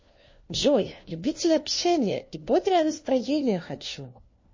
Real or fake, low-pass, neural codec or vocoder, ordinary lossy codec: fake; 7.2 kHz; codec, 16 kHz, 1 kbps, FunCodec, trained on LibriTTS, 50 frames a second; MP3, 32 kbps